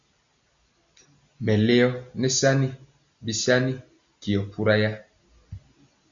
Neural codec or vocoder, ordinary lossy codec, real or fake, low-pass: none; Opus, 64 kbps; real; 7.2 kHz